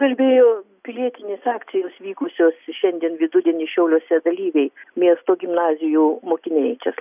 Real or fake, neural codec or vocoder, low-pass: real; none; 3.6 kHz